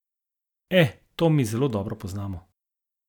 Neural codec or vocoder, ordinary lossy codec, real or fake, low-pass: none; none; real; 19.8 kHz